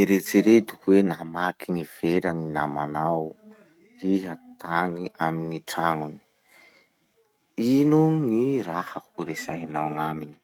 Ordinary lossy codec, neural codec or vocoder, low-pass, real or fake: none; codec, 44.1 kHz, 7.8 kbps, DAC; 19.8 kHz; fake